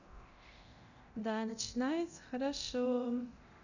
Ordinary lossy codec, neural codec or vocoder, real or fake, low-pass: none; codec, 24 kHz, 0.9 kbps, DualCodec; fake; 7.2 kHz